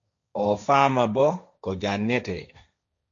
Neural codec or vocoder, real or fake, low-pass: codec, 16 kHz, 1.1 kbps, Voila-Tokenizer; fake; 7.2 kHz